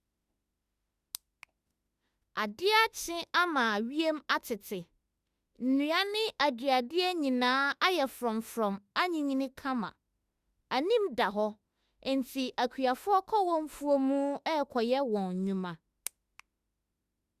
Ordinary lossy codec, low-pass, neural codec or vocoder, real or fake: Opus, 64 kbps; 14.4 kHz; autoencoder, 48 kHz, 32 numbers a frame, DAC-VAE, trained on Japanese speech; fake